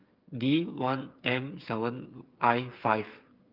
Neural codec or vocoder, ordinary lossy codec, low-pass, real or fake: codec, 16 kHz, 8 kbps, FreqCodec, smaller model; Opus, 32 kbps; 5.4 kHz; fake